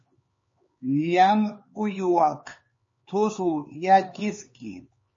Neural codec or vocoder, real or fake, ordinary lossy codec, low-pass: codec, 16 kHz, 4 kbps, X-Codec, HuBERT features, trained on LibriSpeech; fake; MP3, 32 kbps; 7.2 kHz